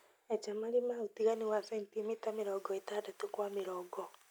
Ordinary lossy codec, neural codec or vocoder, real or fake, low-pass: none; none; real; none